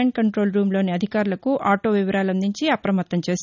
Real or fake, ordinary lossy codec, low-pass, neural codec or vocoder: real; none; 7.2 kHz; none